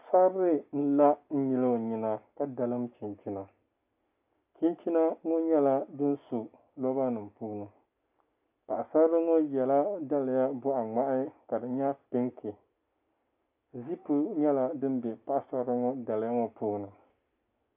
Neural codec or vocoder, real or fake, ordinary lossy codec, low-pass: none; real; AAC, 32 kbps; 3.6 kHz